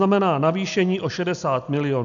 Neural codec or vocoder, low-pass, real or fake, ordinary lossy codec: none; 7.2 kHz; real; MP3, 96 kbps